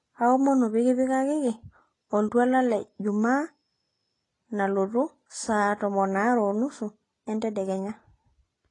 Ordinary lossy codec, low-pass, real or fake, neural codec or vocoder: AAC, 32 kbps; 10.8 kHz; real; none